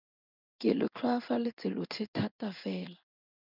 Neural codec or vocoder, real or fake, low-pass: codec, 16 kHz in and 24 kHz out, 1 kbps, XY-Tokenizer; fake; 5.4 kHz